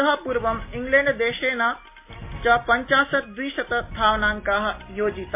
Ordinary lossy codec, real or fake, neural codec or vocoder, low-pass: MP3, 24 kbps; real; none; 3.6 kHz